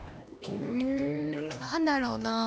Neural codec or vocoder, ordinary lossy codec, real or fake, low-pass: codec, 16 kHz, 1 kbps, X-Codec, HuBERT features, trained on LibriSpeech; none; fake; none